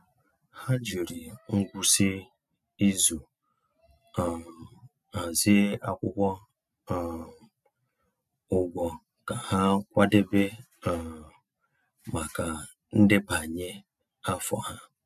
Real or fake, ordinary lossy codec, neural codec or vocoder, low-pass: real; none; none; 14.4 kHz